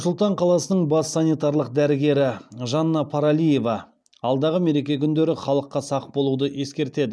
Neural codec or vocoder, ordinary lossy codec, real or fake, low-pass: none; none; real; none